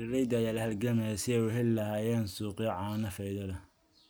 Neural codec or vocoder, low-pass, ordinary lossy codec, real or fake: none; none; none; real